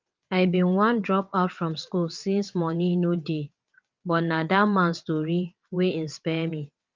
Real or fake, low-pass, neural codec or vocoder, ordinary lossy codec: fake; 7.2 kHz; vocoder, 22.05 kHz, 80 mel bands, WaveNeXt; Opus, 24 kbps